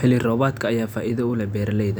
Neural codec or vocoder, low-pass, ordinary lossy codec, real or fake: none; none; none; real